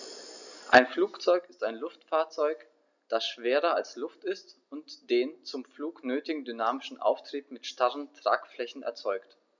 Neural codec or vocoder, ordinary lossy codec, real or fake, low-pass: none; none; real; 7.2 kHz